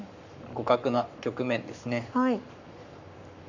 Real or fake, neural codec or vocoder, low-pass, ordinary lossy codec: fake; codec, 44.1 kHz, 7.8 kbps, Pupu-Codec; 7.2 kHz; none